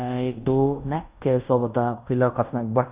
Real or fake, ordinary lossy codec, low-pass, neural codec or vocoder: fake; Opus, 64 kbps; 3.6 kHz; codec, 16 kHz in and 24 kHz out, 0.9 kbps, LongCat-Audio-Codec, fine tuned four codebook decoder